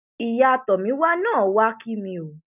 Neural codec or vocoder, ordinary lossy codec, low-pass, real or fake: none; none; 3.6 kHz; real